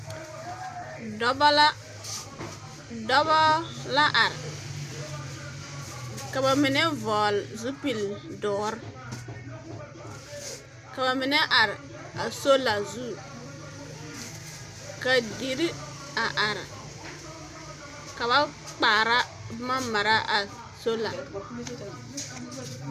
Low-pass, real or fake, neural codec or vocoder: 14.4 kHz; real; none